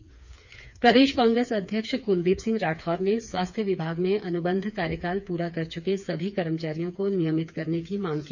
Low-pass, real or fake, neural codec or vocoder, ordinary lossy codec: 7.2 kHz; fake; codec, 16 kHz, 4 kbps, FreqCodec, smaller model; none